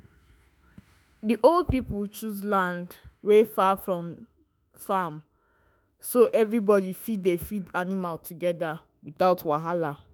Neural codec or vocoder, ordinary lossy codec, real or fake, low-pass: autoencoder, 48 kHz, 32 numbers a frame, DAC-VAE, trained on Japanese speech; none; fake; none